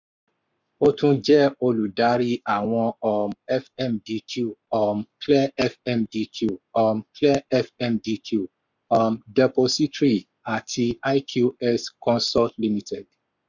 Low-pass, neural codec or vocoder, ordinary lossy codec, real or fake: 7.2 kHz; codec, 44.1 kHz, 7.8 kbps, Pupu-Codec; none; fake